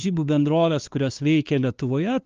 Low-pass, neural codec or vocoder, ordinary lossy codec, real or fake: 7.2 kHz; codec, 16 kHz, 2 kbps, FunCodec, trained on LibriTTS, 25 frames a second; Opus, 32 kbps; fake